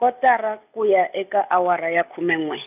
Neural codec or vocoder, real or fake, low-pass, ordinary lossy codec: none; real; 3.6 kHz; none